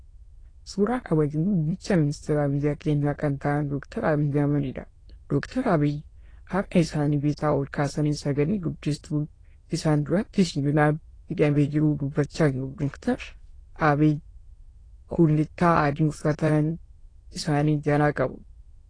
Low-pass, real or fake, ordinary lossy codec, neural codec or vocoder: 9.9 kHz; fake; AAC, 32 kbps; autoencoder, 22.05 kHz, a latent of 192 numbers a frame, VITS, trained on many speakers